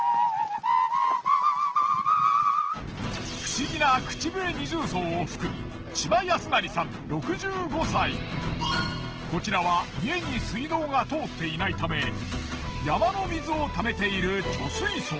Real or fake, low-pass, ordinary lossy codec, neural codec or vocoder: real; 7.2 kHz; Opus, 16 kbps; none